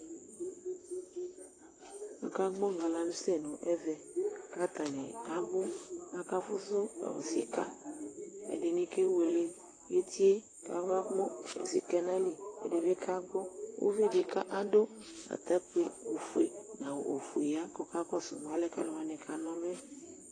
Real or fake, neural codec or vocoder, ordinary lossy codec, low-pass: fake; vocoder, 44.1 kHz, 128 mel bands, Pupu-Vocoder; AAC, 32 kbps; 9.9 kHz